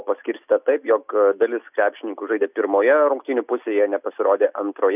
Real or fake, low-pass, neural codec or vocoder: real; 3.6 kHz; none